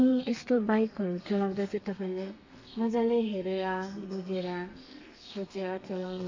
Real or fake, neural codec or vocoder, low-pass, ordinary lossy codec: fake; codec, 32 kHz, 1.9 kbps, SNAC; 7.2 kHz; MP3, 64 kbps